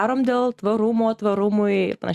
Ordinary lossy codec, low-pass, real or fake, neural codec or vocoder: Opus, 64 kbps; 14.4 kHz; real; none